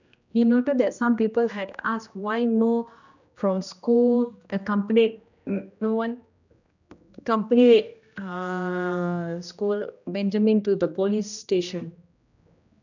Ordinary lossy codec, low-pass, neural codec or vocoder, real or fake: none; 7.2 kHz; codec, 16 kHz, 1 kbps, X-Codec, HuBERT features, trained on general audio; fake